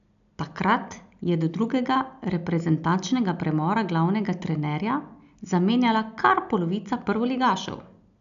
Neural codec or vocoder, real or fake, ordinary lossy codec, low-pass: none; real; none; 7.2 kHz